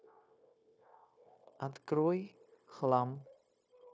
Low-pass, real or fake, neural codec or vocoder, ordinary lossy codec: none; fake; codec, 16 kHz, 0.9 kbps, LongCat-Audio-Codec; none